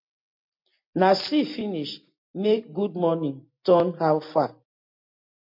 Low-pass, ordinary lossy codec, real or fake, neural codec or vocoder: 5.4 kHz; MP3, 32 kbps; real; none